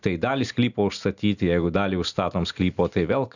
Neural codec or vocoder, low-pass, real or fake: none; 7.2 kHz; real